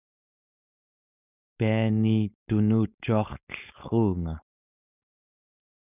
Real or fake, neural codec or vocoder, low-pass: fake; codec, 16 kHz, 4.8 kbps, FACodec; 3.6 kHz